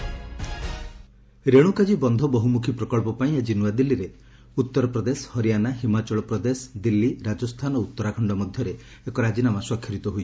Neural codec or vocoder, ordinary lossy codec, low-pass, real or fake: none; none; none; real